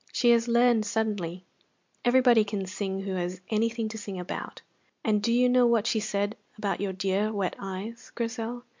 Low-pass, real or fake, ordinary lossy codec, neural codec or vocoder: 7.2 kHz; real; MP3, 64 kbps; none